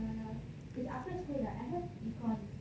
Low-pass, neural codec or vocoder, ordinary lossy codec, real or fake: none; none; none; real